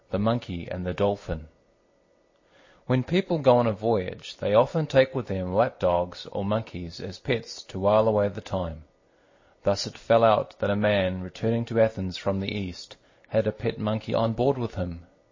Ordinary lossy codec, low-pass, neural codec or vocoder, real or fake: MP3, 32 kbps; 7.2 kHz; none; real